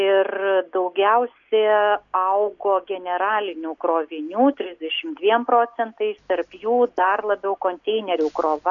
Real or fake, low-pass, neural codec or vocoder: real; 7.2 kHz; none